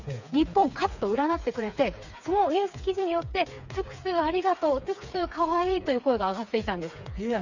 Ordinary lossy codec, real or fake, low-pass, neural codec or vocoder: none; fake; 7.2 kHz; codec, 16 kHz, 4 kbps, FreqCodec, smaller model